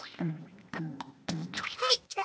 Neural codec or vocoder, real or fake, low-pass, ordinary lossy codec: codec, 16 kHz, 0.8 kbps, ZipCodec; fake; none; none